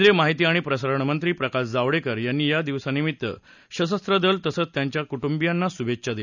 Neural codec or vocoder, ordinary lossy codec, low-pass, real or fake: none; none; 7.2 kHz; real